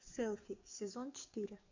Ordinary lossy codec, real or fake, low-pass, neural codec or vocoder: MP3, 64 kbps; fake; 7.2 kHz; codec, 44.1 kHz, 7.8 kbps, DAC